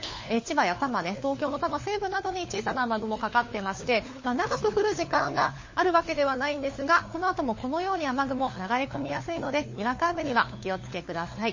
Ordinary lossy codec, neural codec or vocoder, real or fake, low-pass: MP3, 32 kbps; codec, 16 kHz, 4 kbps, FunCodec, trained on LibriTTS, 50 frames a second; fake; 7.2 kHz